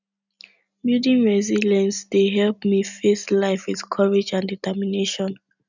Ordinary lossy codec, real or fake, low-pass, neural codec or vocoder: none; real; 7.2 kHz; none